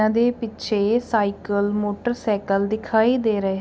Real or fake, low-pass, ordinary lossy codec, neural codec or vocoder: real; none; none; none